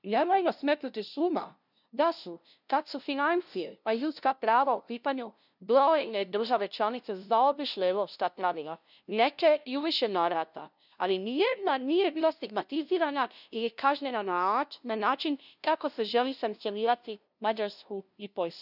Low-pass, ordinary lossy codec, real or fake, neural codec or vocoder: 5.4 kHz; none; fake; codec, 16 kHz, 0.5 kbps, FunCodec, trained on LibriTTS, 25 frames a second